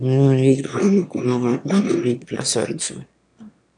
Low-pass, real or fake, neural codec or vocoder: 9.9 kHz; fake; autoencoder, 22.05 kHz, a latent of 192 numbers a frame, VITS, trained on one speaker